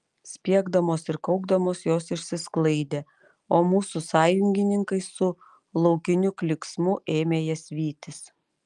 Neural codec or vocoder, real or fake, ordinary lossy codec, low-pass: none; real; Opus, 32 kbps; 10.8 kHz